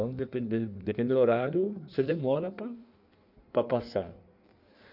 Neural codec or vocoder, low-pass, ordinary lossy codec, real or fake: codec, 16 kHz in and 24 kHz out, 1.1 kbps, FireRedTTS-2 codec; 5.4 kHz; none; fake